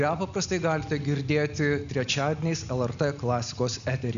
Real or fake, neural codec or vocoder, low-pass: real; none; 7.2 kHz